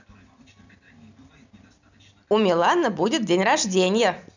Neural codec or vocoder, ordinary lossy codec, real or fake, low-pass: none; none; real; 7.2 kHz